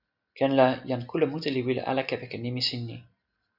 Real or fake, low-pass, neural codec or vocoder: real; 5.4 kHz; none